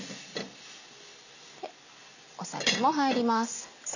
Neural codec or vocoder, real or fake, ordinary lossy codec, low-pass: none; real; none; 7.2 kHz